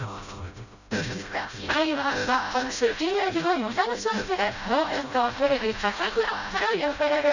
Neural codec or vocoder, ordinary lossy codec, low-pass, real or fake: codec, 16 kHz, 0.5 kbps, FreqCodec, smaller model; none; 7.2 kHz; fake